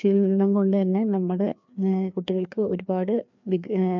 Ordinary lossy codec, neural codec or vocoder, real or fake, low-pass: MP3, 64 kbps; codec, 16 kHz, 2 kbps, FreqCodec, larger model; fake; 7.2 kHz